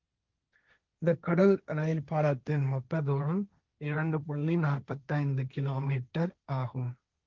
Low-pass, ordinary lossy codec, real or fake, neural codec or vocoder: 7.2 kHz; Opus, 24 kbps; fake; codec, 16 kHz, 1.1 kbps, Voila-Tokenizer